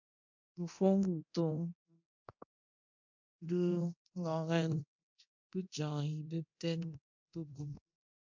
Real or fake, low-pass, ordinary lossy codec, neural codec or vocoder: fake; 7.2 kHz; MP3, 48 kbps; codec, 24 kHz, 0.9 kbps, DualCodec